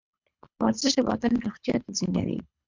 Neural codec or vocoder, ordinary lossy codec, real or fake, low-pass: codec, 24 kHz, 3 kbps, HILCodec; MP3, 64 kbps; fake; 7.2 kHz